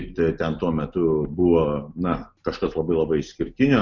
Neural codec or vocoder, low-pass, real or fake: none; 7.2 kHz; real